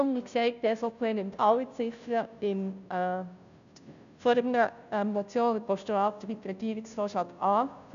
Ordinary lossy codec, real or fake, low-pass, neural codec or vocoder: none; fake; 7.2 kHz; codec, 16 kHz, 0.5 kbps, FunCodec, trained on Chinese and English, 25 frames a second